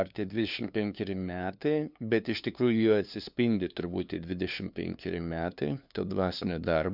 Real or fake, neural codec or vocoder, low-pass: fake; codec, 16 kHz, 2 kbps, FunCodec, trained on LibriTTS, 25 frames a second; 5.4 kHz